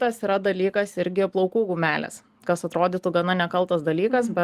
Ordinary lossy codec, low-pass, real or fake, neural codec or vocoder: Opus, 24 kbps; 14.4 kHz; real; none